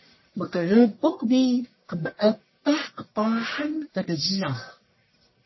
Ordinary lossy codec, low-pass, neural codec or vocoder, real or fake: MP3, 24 kbps; 7.2 kHz; codec, 44.1 kHz, 1.7 kbps, Pupu-Codec; fake